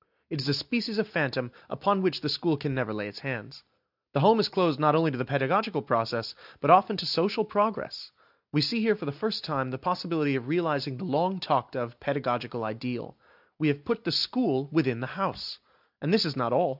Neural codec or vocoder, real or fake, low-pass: none; real; 5.4 kHz